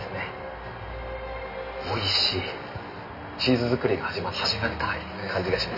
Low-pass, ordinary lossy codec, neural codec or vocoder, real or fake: 5.4 kHz; none; none; real